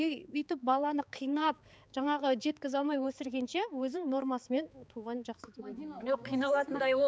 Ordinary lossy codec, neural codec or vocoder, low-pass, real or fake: none; codec, 16 kHz, 4 kbps, X-Codec, HuBERT features, trained on balanced general audio; none; fake